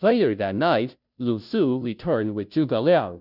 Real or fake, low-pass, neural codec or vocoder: fake; 5.4 kHz; codec, 16 kHz, 0.5 kbps, FunCodec, trained on Chinese and English, 25 frames a second